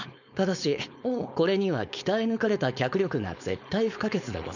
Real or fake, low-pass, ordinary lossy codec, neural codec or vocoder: fake; 7.2 kHz; none; codec, 16 kHz, 4.8 kbps, FACodec